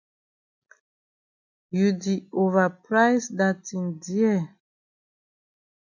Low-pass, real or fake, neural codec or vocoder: 7.2 kHz; real; none